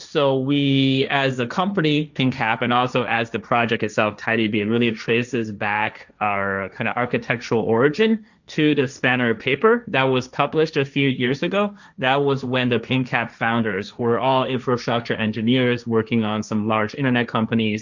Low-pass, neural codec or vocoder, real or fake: 7.2 kHz; codec, 16 kHz, 1.1 kbps, Voila-Tokenizer; fake